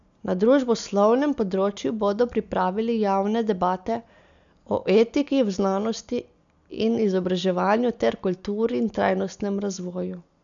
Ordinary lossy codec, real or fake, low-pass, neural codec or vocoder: none; real; 7.2 kHz; none